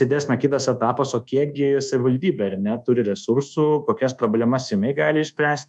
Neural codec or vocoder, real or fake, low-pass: codec, 24 kHz, 1.2 kbps, DualCodec; fake; 10.8 kHz